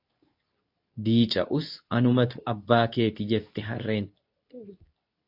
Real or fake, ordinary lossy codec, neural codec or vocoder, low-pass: fake; AAC, 48 kbps; codec, 24 kHz, 0.9 kbps, WavTokenizer, medium speech release version 2; 5.4 kHz